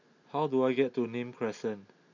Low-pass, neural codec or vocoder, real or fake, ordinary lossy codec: 7.2 kHz; none; real; MP3, 48 kbps